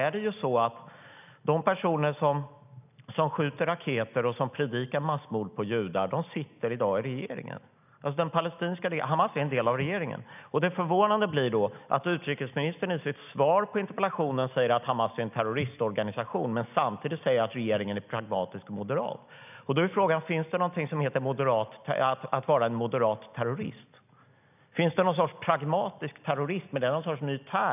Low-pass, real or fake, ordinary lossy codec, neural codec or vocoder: 3.6 kHz; real; none; none